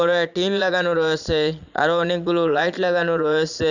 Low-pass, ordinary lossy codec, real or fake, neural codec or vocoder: 7.2 kHz; none; fake; vocoder, 22.05 kHz, 80 mel bands, Vocos